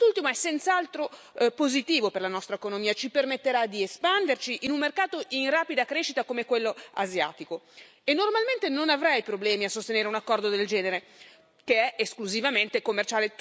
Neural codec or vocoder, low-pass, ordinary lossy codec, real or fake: none; none; none; real